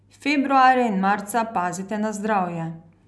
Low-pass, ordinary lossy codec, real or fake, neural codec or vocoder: none; none; real; none